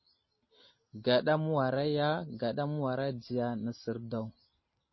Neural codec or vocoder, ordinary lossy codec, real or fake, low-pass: none; MP3, 24 kbps; real; 7.2 kHz